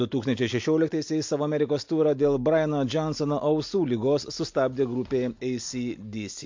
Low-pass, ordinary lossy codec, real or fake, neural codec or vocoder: 7.2 kHz; MP3, 48 kbps; real; none